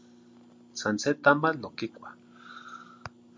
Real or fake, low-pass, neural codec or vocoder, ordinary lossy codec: real; 7.2 kHz; none; MP3, 48 kbps